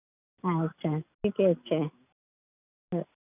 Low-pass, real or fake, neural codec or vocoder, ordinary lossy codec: 3.6 kHz; real; none; none